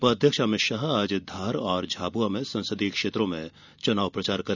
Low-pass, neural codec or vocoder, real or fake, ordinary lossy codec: 7.2 kHz; none; real; none